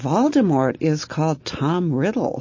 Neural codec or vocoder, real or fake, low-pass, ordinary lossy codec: none; real; 7.2 kHz; MP3, 32 kbps